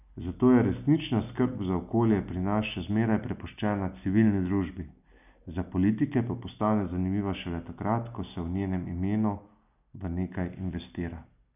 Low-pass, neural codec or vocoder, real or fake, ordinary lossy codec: 3.6 kHz; none; real; none